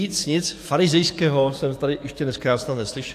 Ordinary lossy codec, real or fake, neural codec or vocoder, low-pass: AAC, 64 kbps; fake; codec, 44.1 kHz, 7.8 kbps, DAC; 14.4 kHz